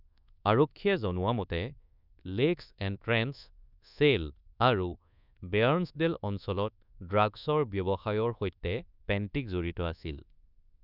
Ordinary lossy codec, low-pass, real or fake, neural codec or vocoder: none; 5.4 kHz; fake; codec, 24 kHz, 1.2 kbps, DualCodec